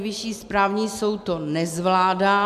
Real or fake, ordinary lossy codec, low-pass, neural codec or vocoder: real; MP3, 96 kbps; 14.4 kHz; none